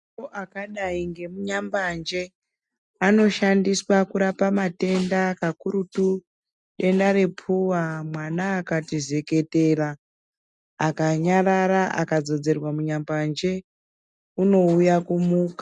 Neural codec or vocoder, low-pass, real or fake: none; 10.8 kHz; real